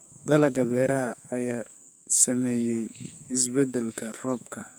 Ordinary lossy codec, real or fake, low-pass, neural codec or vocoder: none; fake; none; codec, 44.1 kHz, 2.6 kbps, SNAC